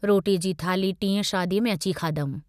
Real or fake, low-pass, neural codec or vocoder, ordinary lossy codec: real; 14.4 kHz; none; none